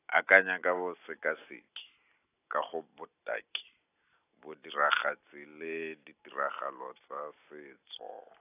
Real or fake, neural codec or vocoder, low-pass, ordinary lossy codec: real; none; 3.6 kHz; AAC, 24 kbps